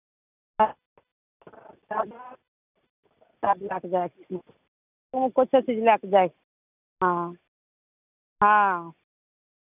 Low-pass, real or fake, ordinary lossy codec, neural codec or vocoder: 3.6 kHz; real; none; none